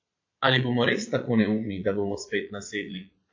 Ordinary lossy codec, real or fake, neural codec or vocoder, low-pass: AAC, 48 kbps; fake; vocoder, 22.05 kHz, 80 mel bands, Vocos; 7.2 kHz